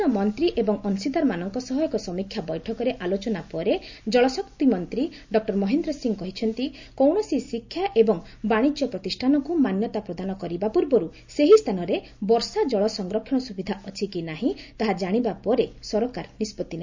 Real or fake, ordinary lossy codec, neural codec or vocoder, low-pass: real; MP3, 64 kbps; none; 7.2 kHz